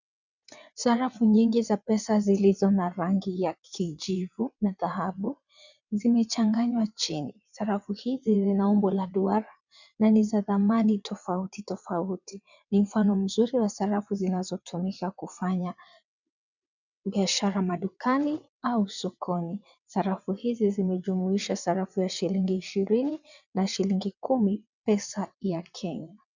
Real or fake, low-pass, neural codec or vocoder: fake; 7.2 kHz; vocoder, 22.05 kHz, 80 mel bands, WaveNeXt